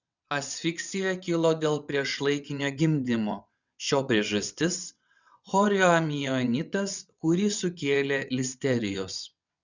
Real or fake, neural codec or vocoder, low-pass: fake; vocoder, 22.05 kHz, 80 mel bands, WaveNeXt; 7.2 kHz